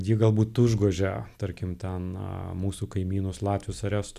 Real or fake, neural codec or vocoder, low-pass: real; none; 14.4 kHz